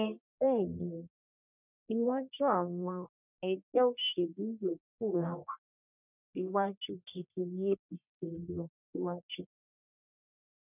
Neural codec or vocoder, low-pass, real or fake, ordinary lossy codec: codec, 44.1 kHz, 1.7 kbps, Pupu-Codec; 3.6 kHz; fake; none